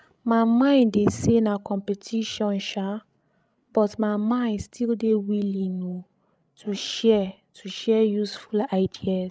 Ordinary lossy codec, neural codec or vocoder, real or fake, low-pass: none; codec, 16 kHz, 16 kbps, FreqCodec, larger model; fake; none